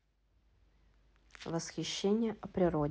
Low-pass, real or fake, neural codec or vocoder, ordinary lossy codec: none; real; none; none